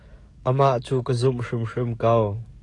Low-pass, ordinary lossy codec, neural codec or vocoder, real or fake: 10.8 kHz; AAC, 48 kbps; codec, 44.1 kHz, 7.8 kbps, DAC; fake